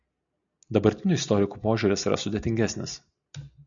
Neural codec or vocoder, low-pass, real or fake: none; 7.2 kHz; real